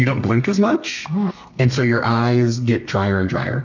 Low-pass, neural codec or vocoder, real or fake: 7.2 kHz; codec, 32 kHz, 1.9 kbps, SNAC; fake